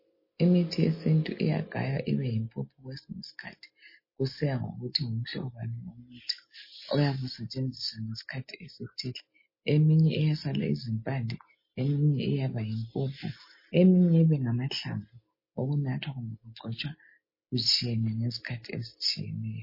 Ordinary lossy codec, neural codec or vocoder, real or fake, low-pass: MP3, 24 kbps; none; real; 5.4 kHz